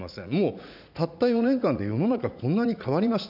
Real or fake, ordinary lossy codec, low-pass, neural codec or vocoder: fake; none; 5.4 kHz; vocoder, 44.1 kHz, 80 mel bands, Vocos